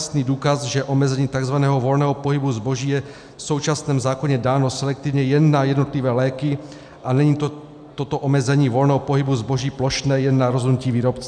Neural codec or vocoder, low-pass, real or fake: none; 9.9 kHz; real